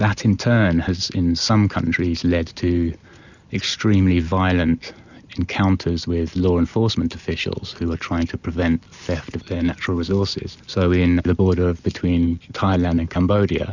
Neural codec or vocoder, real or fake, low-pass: none; real; 7.2 kHz